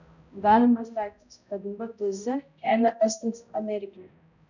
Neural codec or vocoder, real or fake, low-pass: codec, 16 kHz, 0.5 kbps, X-Codec, HuBERT features, trained on balanced general audio; fake; 7.2 kHz